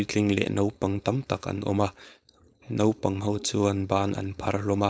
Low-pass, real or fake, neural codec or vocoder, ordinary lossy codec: none; fake; codec, 16 kHz, 4.8 kbps, FACodec; none